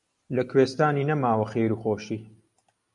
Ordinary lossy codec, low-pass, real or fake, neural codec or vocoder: MP3, 96 kbps; 10.8 kHz; real; none